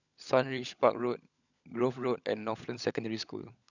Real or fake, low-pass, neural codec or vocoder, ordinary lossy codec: fake; 7.2 kHz; codec, 16 kHz, 16 kbps, FunCodec, trained on LibriTTS, 50 frames a second; none